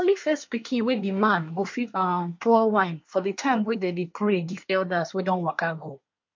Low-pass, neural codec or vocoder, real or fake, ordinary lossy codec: 7.2 kHz; codec, 24 kHz, 1 kbps, SNAC; fake; MP3, 48 kbps